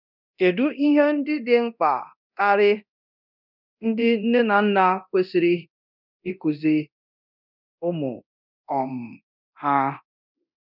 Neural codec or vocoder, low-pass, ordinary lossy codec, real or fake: codec, 24 kHz, 0.9 kbps, DualCodec; 5.4 kHz; none; fake